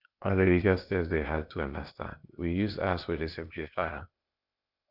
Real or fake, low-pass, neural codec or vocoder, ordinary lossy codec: fake; 5.4 kHz; codec, 16 kHz, 0.8 kbps, ZipCodec; none